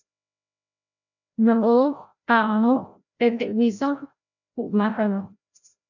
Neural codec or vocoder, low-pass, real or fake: codec, 16 kHz, 0.5 kbps, FreqCodec, larger model; 7.2 kHz; fake